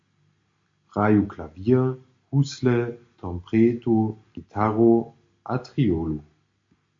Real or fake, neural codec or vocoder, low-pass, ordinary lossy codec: real; none; 7.2 kHz; MP3, 48 kbps